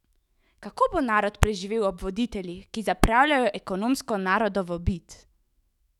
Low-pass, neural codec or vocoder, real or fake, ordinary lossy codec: 19.8 kHz; autoencoder, 48 kHz, 128 numbers a frame, DAC-VAE, trained on Japanese speech; fake; none